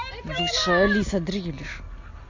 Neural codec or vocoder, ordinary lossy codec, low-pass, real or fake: none; AAC, 48 kbps; 7.2 kHz; real